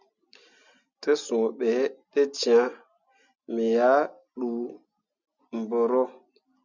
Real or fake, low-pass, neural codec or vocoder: real; 7.2 kHz; none